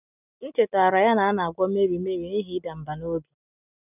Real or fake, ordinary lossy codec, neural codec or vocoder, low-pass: real; none; none; 3.6 kHz